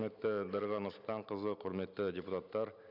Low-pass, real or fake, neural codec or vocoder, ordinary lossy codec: 5.4 kHz; real; none; none